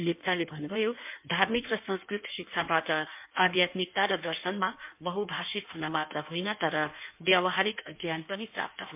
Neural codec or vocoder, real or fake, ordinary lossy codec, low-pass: codec, 16 kHz in and 24 kHz out, 1.1 kbps, FireRedTTS-2 codec; fake; MP3, 32 kbps; 3.6 kHz